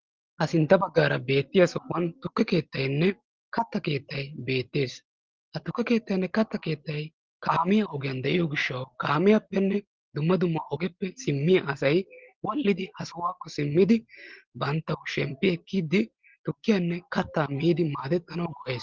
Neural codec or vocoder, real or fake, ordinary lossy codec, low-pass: none; real; Opus, 16 kbps; 7.2 kHz